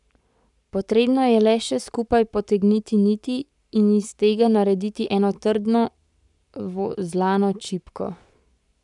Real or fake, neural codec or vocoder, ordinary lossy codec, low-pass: fake; codec, 44.1 kHz, 7.8 kbps, Pupu-Codec; none; 10.8 kHz